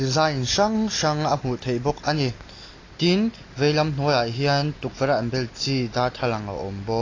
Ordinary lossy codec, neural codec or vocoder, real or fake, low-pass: AAC, 32 kbps; none; real; 7.2 kHz